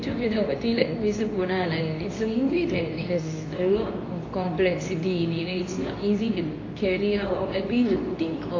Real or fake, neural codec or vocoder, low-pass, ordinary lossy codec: fake; codec, 24 kHz, 0.9 kbps, WavTokenizer, medium speech release version 2; 7.2 kHz; AAC, 32 kbps